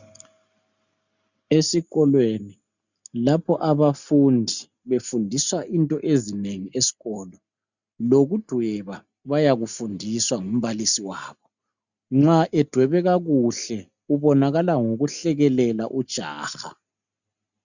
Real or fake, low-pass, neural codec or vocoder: real; 7.2 kHz; none